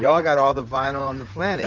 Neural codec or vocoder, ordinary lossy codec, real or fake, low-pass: codec, 16 kHz in and 24 kHz out, 2.2 kbps, FireRedTTS-2 codec; Opus, 24 kbps; fake; 7.2 kHz